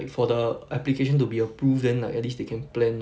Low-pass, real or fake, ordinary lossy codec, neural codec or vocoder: none; real; none; none